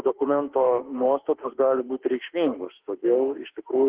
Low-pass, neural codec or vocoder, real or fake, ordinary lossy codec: 3.6 kHz; autoencoder, 48 kHz, 32 numbers a frame, DAC-VAE, trained on Japanese speech; fake; Opus, 16 kbps